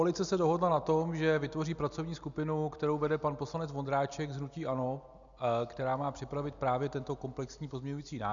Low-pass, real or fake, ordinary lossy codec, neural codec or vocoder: 7.2 kHz; real; MP3, 96 kbps; none